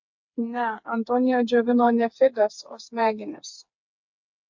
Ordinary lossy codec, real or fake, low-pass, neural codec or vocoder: MP3, 48 kbps; fake; 7.2 kHz; codec, 16 kHz, 4 kbps, FreqCodec, smaller model